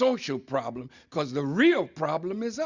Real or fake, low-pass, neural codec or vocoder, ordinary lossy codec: real; 7.2 kHz; none; Opus, 64 kbps